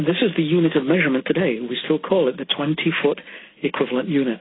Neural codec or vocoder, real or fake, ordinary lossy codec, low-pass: none; real; AAC, 16 kbps; 7.2 kHz